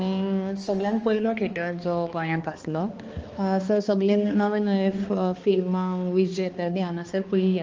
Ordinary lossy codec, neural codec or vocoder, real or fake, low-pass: Opus, 24 kbps; codec, 16 kHz, 2 kbps, X-Codec, HuBERT features, trained on balanced general audio; fake; 7.2 kHz